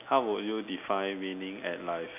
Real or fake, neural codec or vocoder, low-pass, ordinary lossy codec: real; none; 3.6 kHz; none